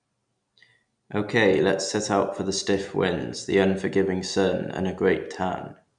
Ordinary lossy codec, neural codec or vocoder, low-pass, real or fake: none; none; 9.9 kHz; real